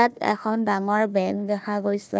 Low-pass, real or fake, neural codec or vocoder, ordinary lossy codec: none; fake; codec, 16 kHz, 1 kbps, FunCodec, trained on Chinese and English, 50 frames a second; none